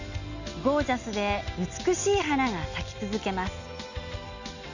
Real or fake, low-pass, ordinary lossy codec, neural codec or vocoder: real; 7.2 kHz; none; none